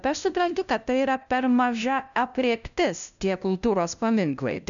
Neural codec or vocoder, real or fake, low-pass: codec, 16 kHz, 0.5 kbps, FunCodec, trained on LibriTTS, 25 frames a second; fake; 7.2 kHz